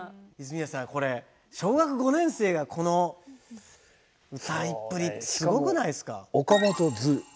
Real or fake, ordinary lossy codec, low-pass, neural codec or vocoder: real; none; none; none